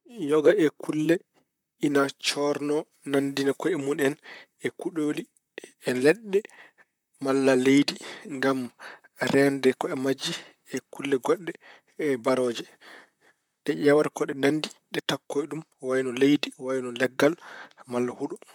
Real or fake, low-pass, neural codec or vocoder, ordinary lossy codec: fake; 19.8 kHz; vocoder, 44.1 kHz, 128 mel bands, Pupu-Vocoder; MP3, 96 kbps